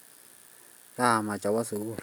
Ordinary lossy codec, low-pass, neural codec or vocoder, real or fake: none; none; none; real